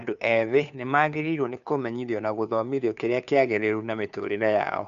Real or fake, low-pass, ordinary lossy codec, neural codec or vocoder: fake; 7.2 kHz; none; codec, 16 kHz, 2 kbps, FunCodec, trained on Chinese and English, 25 frames a second